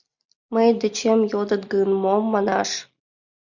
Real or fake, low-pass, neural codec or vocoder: real; 7.2 kHz; none